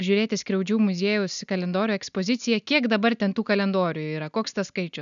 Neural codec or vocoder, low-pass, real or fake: none; 7.2 kHz; real